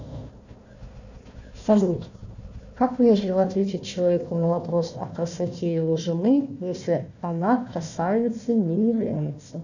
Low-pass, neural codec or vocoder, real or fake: 7.2 kHz; codec, 16 kHz, 1 kbps, FunCodec, trained on Chinese and English, 50 frames a second; fake